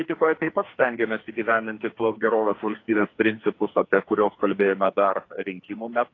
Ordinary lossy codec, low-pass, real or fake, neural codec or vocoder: AAC, 32 kbps; 7.2 kHz; fake; codec, 44.1 kHz, 2.6 kbps, SNAC